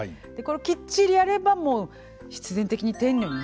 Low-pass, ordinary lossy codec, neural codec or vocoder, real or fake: none; none; none; real